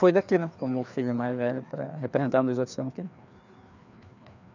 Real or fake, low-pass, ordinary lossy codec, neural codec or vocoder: fake; 7.2 kHz; none; codec, 16 kHz, 2 kbps, FreqCodec, larger model